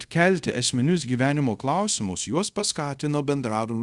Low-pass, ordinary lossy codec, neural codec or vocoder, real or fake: 10.8 kHz; Opus, 64 kbps; codec, 24 kHz, 0.5 kbps, DualCodec; fake